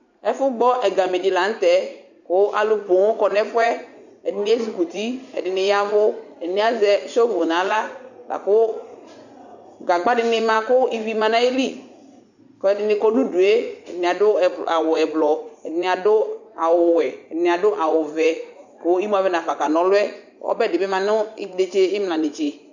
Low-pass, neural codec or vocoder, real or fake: 7.2 kHz; vocoder, 44.1 kHz, 80 mel bands, Vocos; fake